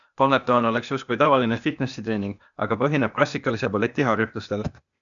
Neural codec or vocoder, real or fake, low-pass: codec, 16 kHz, 0.8 kbps, ZipCodec; fake; 7.2 kHz